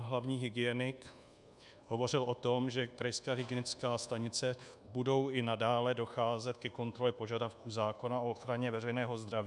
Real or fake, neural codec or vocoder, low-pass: fake; codec, 24 kHz, 1.2 kbps, DualCodec; 10.8 kHz